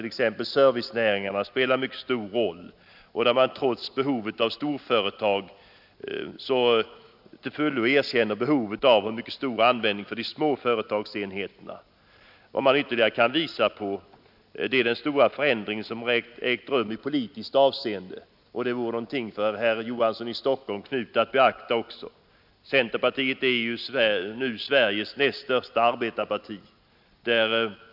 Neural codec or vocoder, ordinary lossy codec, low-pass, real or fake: none; none; 5.4 kHz; real